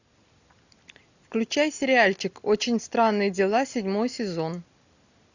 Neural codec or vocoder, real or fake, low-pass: none; real; 7.2 kHz